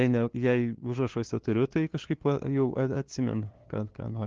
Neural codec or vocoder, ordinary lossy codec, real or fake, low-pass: codec, 16 kHz, 2 kbps, FunCodec, trained on LibriTTS, 25 frames a second; Opus, 16 kbps; fake; 7.2 kHz